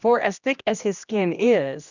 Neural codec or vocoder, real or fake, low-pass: codec, 16 kHz, 1 kbps, X-Codec, HuBERT features, trained on general audio; fake; 7.2 kHz